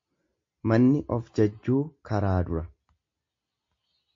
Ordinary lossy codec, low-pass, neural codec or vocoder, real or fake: MP3, 48 kbps; 7.2 kHz; none; real